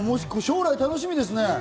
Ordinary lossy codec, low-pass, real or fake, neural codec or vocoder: none; none; real; none